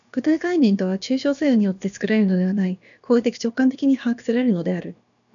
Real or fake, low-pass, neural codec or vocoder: fake; 7.2 kHz; codec, 16 kHz, about 1 kbps, DyCAST, with the encoder's durations